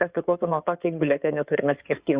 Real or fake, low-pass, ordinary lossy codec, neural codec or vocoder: fake; 3.6 kHz; Opus, 64 kbps; codec, 16 kHz in and 24 kHz out, 2.2 kbps, FireRedTTS-2 codec